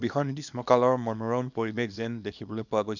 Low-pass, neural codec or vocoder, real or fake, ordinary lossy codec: 7.2 kHz; codec, 24 kHz, 0.9 kbps, WavTokenizer, small release; fake; none